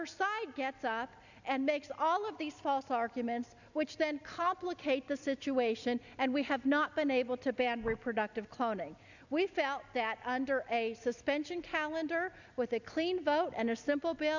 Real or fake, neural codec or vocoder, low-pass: real; none; 7.2 kHz